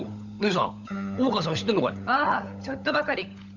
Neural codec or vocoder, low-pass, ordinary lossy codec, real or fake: codec, 16 kHz, 16 kbps, FunCodec, trained on LibriTTS, 50 frames a second; 7.2 kHz; none; fake